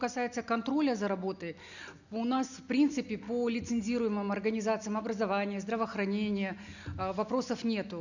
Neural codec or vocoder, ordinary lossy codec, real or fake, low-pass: none; none; real; 7.2 kHz